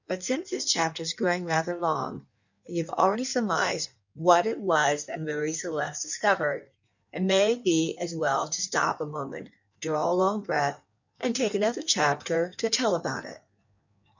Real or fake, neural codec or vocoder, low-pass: fake; codec, 16 kHz in and 24 kHz out, 1.1 kbps, FireRedTTS-2 codec; 7.2 kHz